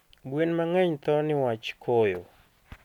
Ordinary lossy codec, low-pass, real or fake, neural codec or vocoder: none; 19.8 kHz; real; none